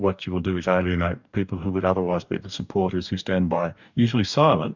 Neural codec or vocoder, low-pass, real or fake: codec, 44.1 kHz, 2.6 kbps, DAC; 7.2 kHz; fake